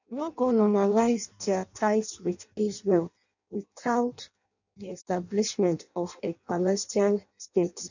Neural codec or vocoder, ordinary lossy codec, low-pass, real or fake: codec, 16 kHz in and 24 kHz out, 0.6 kbps, FireRedTTS-2 codec; none; 7.2 kHz; fake